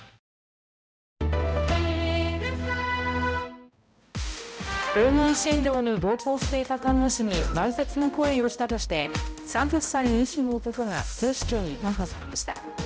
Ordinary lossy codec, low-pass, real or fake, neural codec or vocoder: none; none; fake; codec, 16 kHz, 0.5 kbps, X-Codec, HuBERT features, trained on balanced general audio